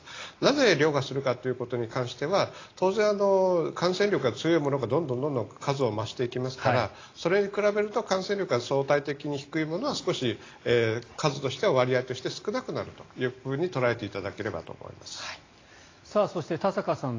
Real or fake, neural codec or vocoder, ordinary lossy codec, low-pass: real; none; AAC, 32 kbps; 7.2 kHz